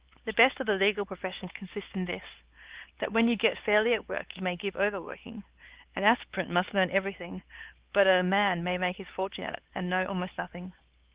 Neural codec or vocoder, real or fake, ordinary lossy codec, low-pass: codec, 16 kHz, 4 kbps, X-Codec, HuBERT features, trained on LibriSpeech; fake; Opus, 16 kbps; 3.6 kHz